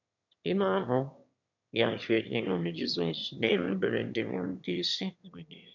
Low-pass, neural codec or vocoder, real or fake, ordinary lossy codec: 7.2 kHz; autoencoder, 22.05 kHz, a latent of 192 numbers a frame, VITS, trained on one speaker; fake; none